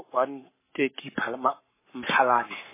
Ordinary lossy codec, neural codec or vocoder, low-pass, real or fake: MP3, 16 kbps; codec, 44.1 kHz, 7.8 kbps, Pupu-Codec; 3.6 kHz; fake